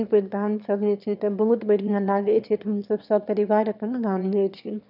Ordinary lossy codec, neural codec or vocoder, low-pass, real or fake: none; autoencoder, 22.05 kHz, a latent of 192 numbers a frame, VITS, trained on one speaker; 5.4 kHz; fake